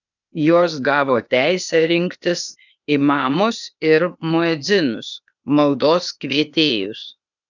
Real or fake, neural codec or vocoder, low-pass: fake; codec, 16 kHz, 0.8 kbps, ZipCodec; 7.2 kHz